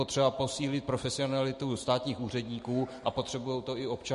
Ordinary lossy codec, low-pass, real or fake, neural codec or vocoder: MP3, 48 kbps; 14.4 kHz; real; none